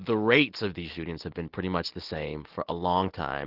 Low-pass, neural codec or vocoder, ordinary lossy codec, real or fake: 5.4 kHz; none; Opus, 16 kbps; real